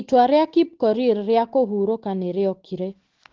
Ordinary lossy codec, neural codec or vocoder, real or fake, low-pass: Opus, 16 kbps; none; real; 7.2 kHz